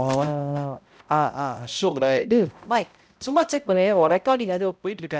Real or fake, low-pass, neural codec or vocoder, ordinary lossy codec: fake; none; codec, 16 kHz, 0.5 kbps, X-Codec, HuBERT features, trained on balanced general audio; none